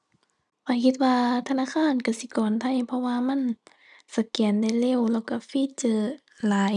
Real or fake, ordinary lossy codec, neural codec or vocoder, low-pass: real; none; none; 10.8 kHz